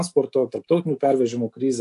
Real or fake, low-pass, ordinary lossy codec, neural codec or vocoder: real; 10.8 kHz; AAC, 64 kbps; none